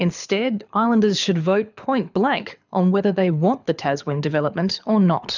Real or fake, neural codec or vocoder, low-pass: fake; codec, 24 kHz, 6 kbps, HILCodec; 7.2 kHz